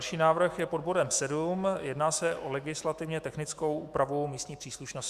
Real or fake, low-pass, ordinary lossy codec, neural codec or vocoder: fake; 14.4 kHz; Opus, 64 kbps; autoencoder, 48 kHz, 128 numbers a frame, DAC-VAE, trained on Japanese speech